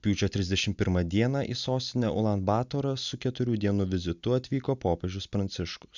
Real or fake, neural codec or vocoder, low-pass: real; none; 7.2 kHz